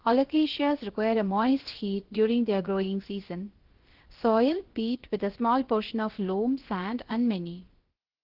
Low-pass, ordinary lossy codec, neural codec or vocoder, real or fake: 5.4 kHz; Opus, 16 kbps; codec, 16 kHz, about 1 kbps, DyCAST, with the encoder's durations; fake